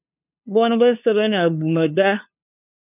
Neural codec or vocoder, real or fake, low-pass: codec, 16 kHz, 2 kbps, FunCodec, trained on LibriTTS, 25 frames a second; fake; 3.6 kHz